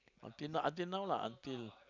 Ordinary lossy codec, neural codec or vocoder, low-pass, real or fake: none; none; 7.2 kHz; real